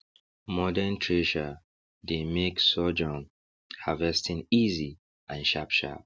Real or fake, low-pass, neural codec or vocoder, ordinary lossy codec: real; none; none; none